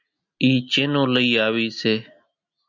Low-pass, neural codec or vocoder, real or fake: 7.2 kHz; none; real